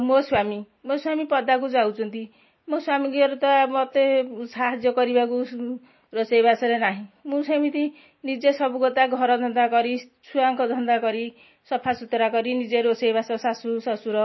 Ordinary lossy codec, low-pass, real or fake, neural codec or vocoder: MP3, 24 kbps; 7.2 kHz; real; none